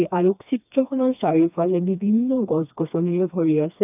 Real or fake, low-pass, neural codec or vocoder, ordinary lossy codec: fake; 3.6 kHz; codec, 16 kHz, 2 kbps, FreqCodec, smaller model; none